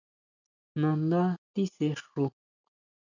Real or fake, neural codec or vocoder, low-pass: real; none; 7.2 kHz